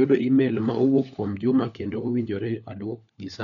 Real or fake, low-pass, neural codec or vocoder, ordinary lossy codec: fake; 7.2 kHz; codec, 16 kHz, 4 kbps, FunCodec, trained on LibriTTS, 50 frames a second; none